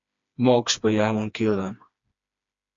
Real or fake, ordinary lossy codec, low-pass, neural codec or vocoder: fake; AAC, 64 kbps; 7.2 kHz; codec, 16 kHz, 2 kbps, FreqCodec, smaller model